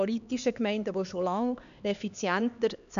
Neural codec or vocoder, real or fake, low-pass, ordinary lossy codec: codec, 16 kHz, 2 kbps, X-Codec, HuBERT features, trained on LibriSpeech; fake; 7.2 kHz; none